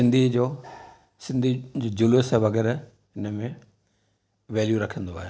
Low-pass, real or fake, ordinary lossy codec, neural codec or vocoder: none; real; none; none